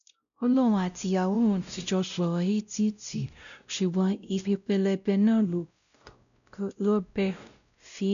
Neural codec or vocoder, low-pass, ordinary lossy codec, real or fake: codec, 16 kHz, 0.5 kbps, X-Codec, WavLM features, trained on Multilingual LibriSpeech; 7.2 kHz; none; fake